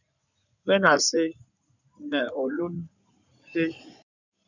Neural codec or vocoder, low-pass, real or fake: codec, 16 kHz in and 24 kHz out, 2.2 kbps, FireRedTTS-2 codec; 7.2 kHz; fake